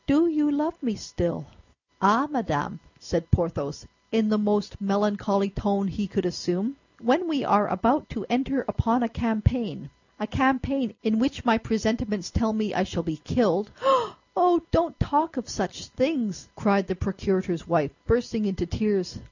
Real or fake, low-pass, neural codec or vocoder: real; 7.2 kHz; none